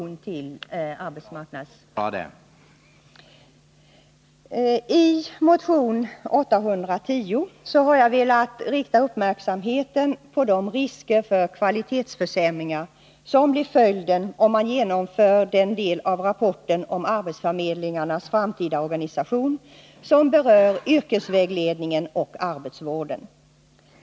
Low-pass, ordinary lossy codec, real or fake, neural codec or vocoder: none; none; real; none